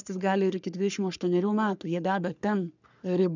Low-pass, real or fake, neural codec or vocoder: 7.2 kHz; fake; codec, 44.1 kHz, 3.4 kbps, Pupu-Codec